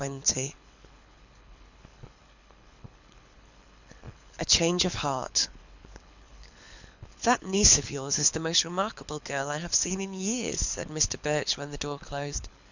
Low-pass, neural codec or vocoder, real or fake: 7.2 kHz; codec, 24 kHz, 6 kbps, HILCodec; fake